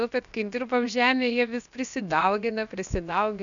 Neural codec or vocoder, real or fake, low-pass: codec, 16 kHz, about 1 kbps, DyCAST, with the encoder's durations; fake; 7.2 kHz